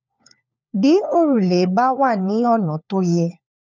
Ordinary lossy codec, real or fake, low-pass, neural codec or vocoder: none; fake; 7.2 kHz; codec, 16 kHz, 4 kbps, FunCodec, trained on LibriTTS, 50 frames a second